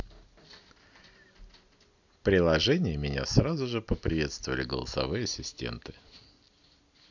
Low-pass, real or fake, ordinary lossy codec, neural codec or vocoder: 7.2 kHz; real; none; none